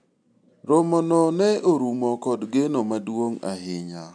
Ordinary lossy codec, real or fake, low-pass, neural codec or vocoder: none; real; 9.9 kHz; none